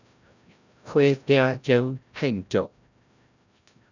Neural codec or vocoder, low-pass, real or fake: codec, 16 kHz, 0.5 kbps, FreqCodec, larger model; 7.2 kHz; fake